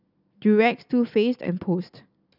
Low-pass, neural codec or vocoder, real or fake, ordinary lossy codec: 5.4 kHz; none; real; none